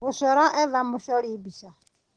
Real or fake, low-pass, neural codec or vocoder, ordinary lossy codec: real; 9.9 kHz; none; Opus, 16 kbps